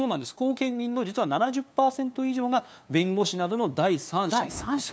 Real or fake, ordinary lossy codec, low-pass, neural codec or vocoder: fake; none; none; codec, 16 kHz, 2 kbps, FunCodec, trained on LibriTTS, 25 frames a second